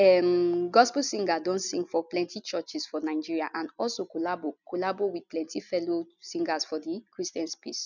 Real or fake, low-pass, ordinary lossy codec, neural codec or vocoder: real; 7.2 kHz; none; none